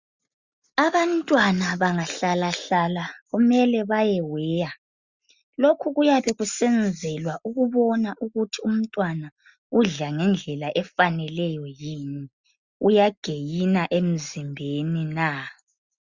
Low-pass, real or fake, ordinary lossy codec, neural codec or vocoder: 7.2 kHz; real; Opus, 64 kbps; none